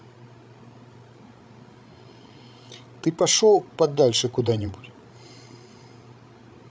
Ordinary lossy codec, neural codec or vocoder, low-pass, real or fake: none; codec, 16 kHz, 16 kbps, FreqCodec, larger model; none; fake